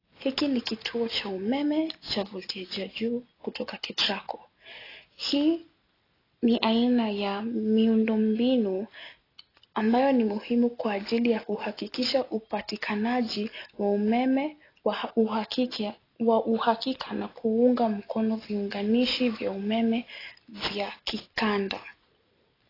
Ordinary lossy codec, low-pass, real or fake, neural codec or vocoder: AAC, 24 kbps; 5.4 kHz; real; none